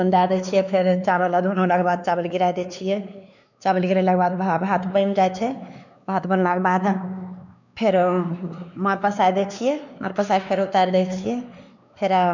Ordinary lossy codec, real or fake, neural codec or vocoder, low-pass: none; fake; codec, 16 kHz, 2 kbps, X-Codec, WavLM features, trained on Multilingual LibriSpeech; 7.2 kHz